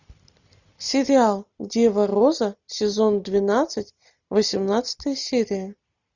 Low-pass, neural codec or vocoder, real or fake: 7.2 kHz; none; real